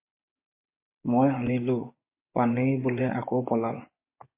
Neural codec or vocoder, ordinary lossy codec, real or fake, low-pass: vocoder, 24 kHz, 100 mel bands, Vocos; MP3, 24 kbps; fake; 3.6 kHz